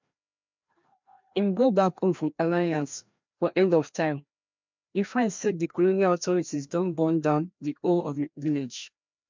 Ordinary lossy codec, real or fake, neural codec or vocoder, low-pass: MP3, 64 kbps; fake; codec, 16 kHz, 1 kbps, FreqCodec, larger model; 7.2 kHz